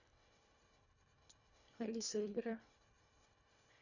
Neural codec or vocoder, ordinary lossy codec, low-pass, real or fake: codec, 24 kHz, 1.5 kbps, HILCodec; none; 7.2 kHz; fake